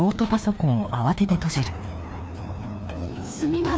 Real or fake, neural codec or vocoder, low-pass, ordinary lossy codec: fake; codec, 16 kHz, 2 kbps, FreqCodec, larger model; none; none